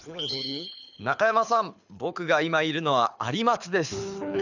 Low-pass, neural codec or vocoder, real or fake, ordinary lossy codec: 7.2 kHz; codec, 24 kHz, 6 kbps, HILCodec; fake; none